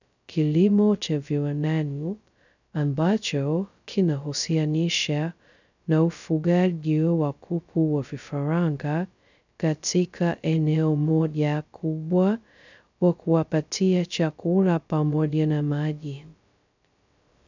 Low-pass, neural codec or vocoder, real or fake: 7.2 kHz; codec, 16 kHz, 0.2 kbps, FocalCodec; fake